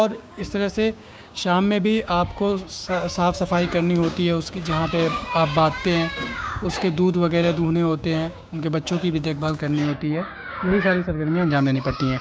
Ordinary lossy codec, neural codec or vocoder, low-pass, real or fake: none; codec, 16 kHz, 6 kbps, DAC; none; fake